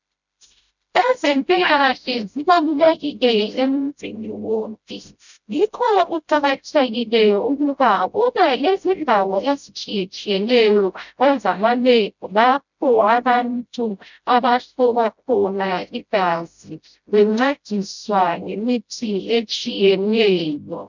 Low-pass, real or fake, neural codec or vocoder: 7.2 kHz; fake; codec, 16 kHz, 0.5 kbps, FreqCodec, smaller model